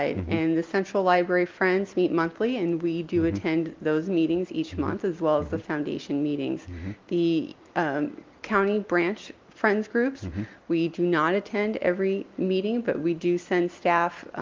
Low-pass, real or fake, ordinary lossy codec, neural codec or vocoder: 7.2 kHz; real; Opus, 32 kbps; none